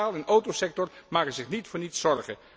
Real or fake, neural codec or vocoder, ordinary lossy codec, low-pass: real; none; none; none